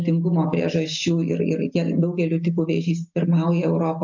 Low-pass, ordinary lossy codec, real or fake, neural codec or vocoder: 7.2 kHz; MP3, 64 kbps; real; none